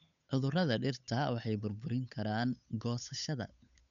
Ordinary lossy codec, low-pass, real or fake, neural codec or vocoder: none; 7.2 kHz; fake; codec, 16 kHz, 8 kbps, FunCodec, trained on Chinese and English, 25 frames a second